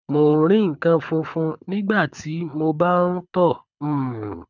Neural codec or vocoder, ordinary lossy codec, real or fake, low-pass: codec, 24 kHz, 6 kbps, HILCodec; none; fake; 7.2 kHz